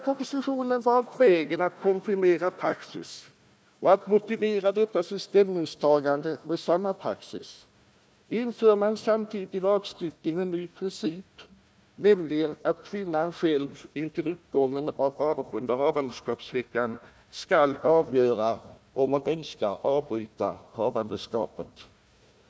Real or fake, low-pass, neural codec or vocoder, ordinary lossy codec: fake; none; codec, 16 kHz, 1 kbps, FunCodec, trained on Chinese and English, 50 frames a second; none